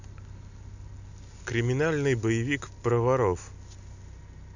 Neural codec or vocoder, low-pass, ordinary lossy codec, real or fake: none; 7.2 kHz; none; real